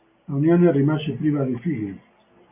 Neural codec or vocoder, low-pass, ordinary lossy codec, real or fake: none; 3.6 kHz; AAC, 32 kbps; real